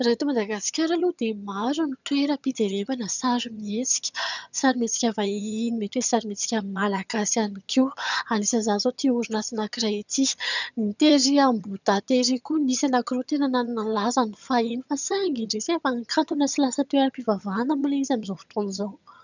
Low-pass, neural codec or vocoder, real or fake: 7.2 kHz; vocoder, 22.05 kHz, 80 mel bands, HiFi-GAN; fake